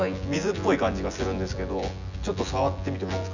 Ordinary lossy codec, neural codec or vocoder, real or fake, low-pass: none; vocoder, 24 kHz, 100 mel bands, Vocos; fake; 7.2 kHz